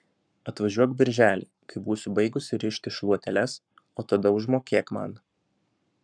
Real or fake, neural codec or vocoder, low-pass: fake; codec, 44.1 kHz, 7.8 kbps, Pupu-Codec; 9.9 kHz